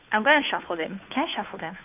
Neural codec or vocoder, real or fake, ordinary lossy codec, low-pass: codec, 16 kHz, 2 kbps, FunCodec, trained on Chinese and English, 25 frames a second; fake; AAC, 32 kbps; 3.6 kHz